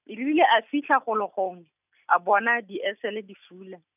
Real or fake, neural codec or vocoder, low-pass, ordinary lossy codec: real; none; 3.6 kHz; none